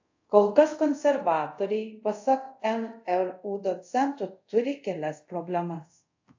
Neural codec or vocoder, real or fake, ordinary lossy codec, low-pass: codec, 24 kHz, 0.5 kbps, DualCodec; fake; AAC, 48 kbps; 7.2 kHz